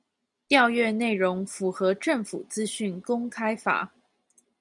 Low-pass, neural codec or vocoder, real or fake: 10.8 kHz; none; real